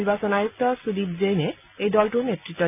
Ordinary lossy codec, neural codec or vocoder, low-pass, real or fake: none; none; 3.6 kHz; real